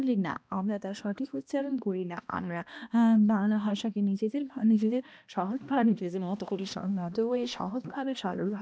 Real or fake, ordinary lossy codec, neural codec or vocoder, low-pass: fake; none; codec, 16 kHz, 1 kbps, X-Codec, HuBERT features, trained on balanced general audio; none